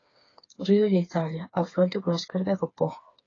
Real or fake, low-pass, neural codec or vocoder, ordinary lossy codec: fake; 7.2 kHz; codec, 16 kHz, 4 kbps, FreqCodec, smaller model; AAC, 32 kbps